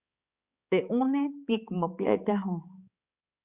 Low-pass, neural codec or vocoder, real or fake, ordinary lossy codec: 3.6 kHz; codec, 16 kHz, 4 kbps, X-Codec, HuBERT features, trained on balanced general audio; fake; Opus, 64 kbps